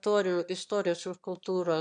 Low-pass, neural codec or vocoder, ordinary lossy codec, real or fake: 9.9 kHz; autoencoder, 22.05 kHz, a latent of 192 numbers a frame, VITS, trained on one speaker; MP3, 96 kbps; fake